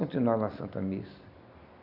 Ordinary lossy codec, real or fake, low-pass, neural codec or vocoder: none; real; 5.4 kHz; none